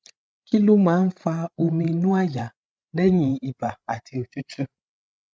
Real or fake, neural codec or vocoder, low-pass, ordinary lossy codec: fake; codec, 16 kHz, 16 kbps, FreqCodec, larger model; none; none